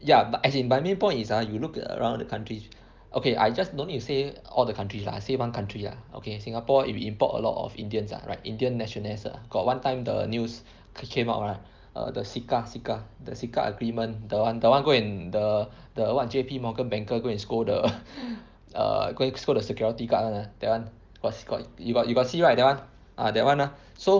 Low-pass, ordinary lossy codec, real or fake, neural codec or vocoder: 7.2 kHz; Opus, 24 kbps; real; none